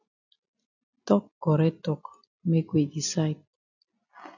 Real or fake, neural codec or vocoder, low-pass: real; none; 7.2 kHz